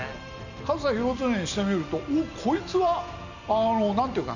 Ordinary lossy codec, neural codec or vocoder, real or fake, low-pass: none; none; real; 7.2 kHz